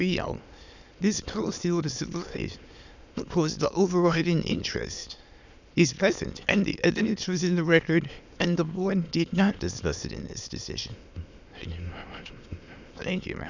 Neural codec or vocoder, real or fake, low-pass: autoencoder, 22.05 kHz, a latent of 192 numbers a frame, VITS, trained on many speakers; fake; 7.2 kHz